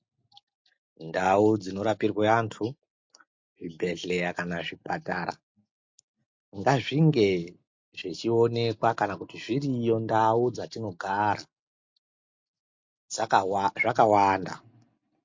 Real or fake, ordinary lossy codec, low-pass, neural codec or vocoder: real; MP3, 48 kbps; 7.2 kHz; none